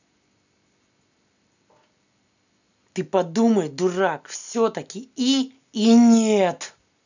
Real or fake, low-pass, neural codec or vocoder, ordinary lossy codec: real; 7.2 kHz; none; none